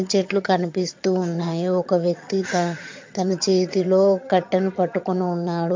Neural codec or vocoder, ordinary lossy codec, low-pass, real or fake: vocoder, 22.05 kHz, 80 mel bands, HiFi-GAN; MP3, 48 kbps; 7.2 kHz; fake